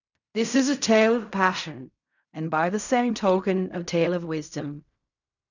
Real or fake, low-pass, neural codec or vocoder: fake; 7.2 kHz; codec, 16 kHz in and 24 kHz out, 0.4 kbps, LongCat-Audio-Codec, fine tuned four codebook decoder